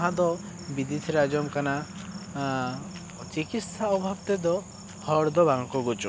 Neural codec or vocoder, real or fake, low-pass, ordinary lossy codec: none; real; none; none